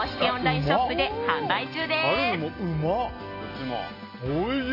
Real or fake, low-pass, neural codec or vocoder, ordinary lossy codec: real; 5.4 kHz; none; MP3, 32 kbps